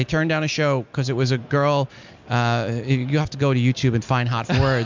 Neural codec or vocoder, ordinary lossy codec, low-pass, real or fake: none; MP3, 64 kbps; 7.2 kHz; real